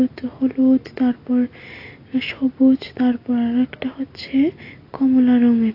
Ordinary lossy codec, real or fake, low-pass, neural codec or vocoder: AAC, 32 kbps; real; 5.4 kHz; none